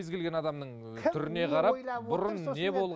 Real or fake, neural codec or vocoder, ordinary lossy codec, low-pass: real; none; none; none